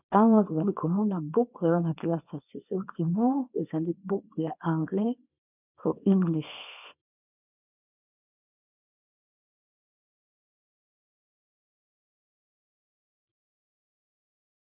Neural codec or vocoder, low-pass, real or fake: codec, 24 kHz, 0.9 kbps, WavTokenizer, small release; 3.6 kHz; fake